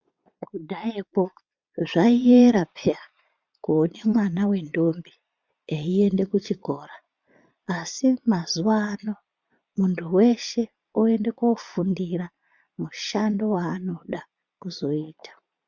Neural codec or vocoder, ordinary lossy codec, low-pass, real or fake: vocoder, 22.05 kHz, 80 mel bands, Vocos; MP3, 64 kbps; 7.2 kHz; fake